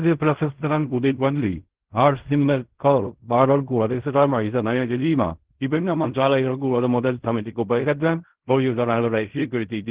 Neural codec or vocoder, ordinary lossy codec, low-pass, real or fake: codec, 16 kHz in and 24 kHz out, 0.4 kbps, LongCat-Audio-Codec, fine tuned four codebook decoder; Opus, 16 kbps; 3.6 kHz; fake